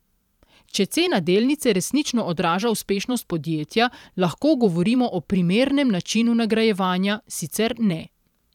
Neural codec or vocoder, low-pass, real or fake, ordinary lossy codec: vocoder, 44.1 kHz, 128 mel bands every 512 samples, BigVGAN v2; 19.8 kHz; fake; none